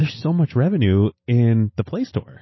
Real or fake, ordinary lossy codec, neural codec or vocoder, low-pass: real; MP3, 24 kbps; none; 7.2 kHz